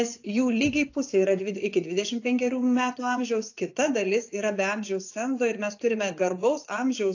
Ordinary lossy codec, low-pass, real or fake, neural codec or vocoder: AAC, 48 kbps; 7.2 kHz; fake; vocoder, 24 kHz, 100 mel bands, Vocos